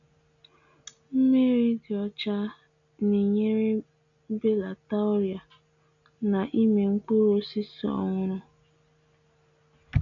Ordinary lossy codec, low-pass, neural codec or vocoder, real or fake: none; 7.2 kHz; none; real